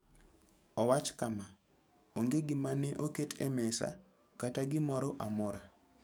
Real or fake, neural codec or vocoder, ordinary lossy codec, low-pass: fake; codec, 44.1 kHz, 7.8 kbps, DAC; none; none